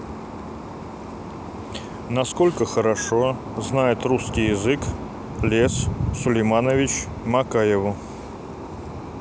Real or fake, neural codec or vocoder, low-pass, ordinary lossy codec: real; none; none; none